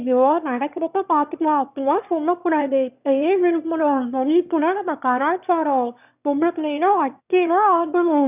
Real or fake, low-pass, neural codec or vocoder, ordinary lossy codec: fake; 3.6 kHz; autoencoder, 22.05 kHz, a latent of 192 numbers a frame, VITS, trained on one speaker; none